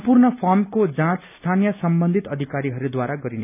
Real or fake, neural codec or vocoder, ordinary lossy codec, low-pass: real; none; none; 3.6 kHz